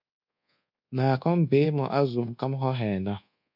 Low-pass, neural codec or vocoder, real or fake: 5.4 kHz; codec, 24 kHz, 1.2 kbps, DualCodec; fake